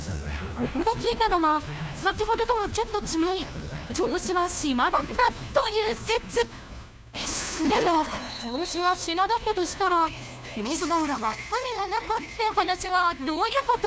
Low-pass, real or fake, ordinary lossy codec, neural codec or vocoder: none; fake; none; codec, 16 kHz, 1 kbps, FunCodec, trained on LibriTTS, 50 frames a second